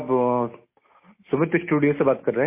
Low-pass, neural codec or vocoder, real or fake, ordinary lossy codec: 3.6 kHz; none; real; MP3, 24 kbps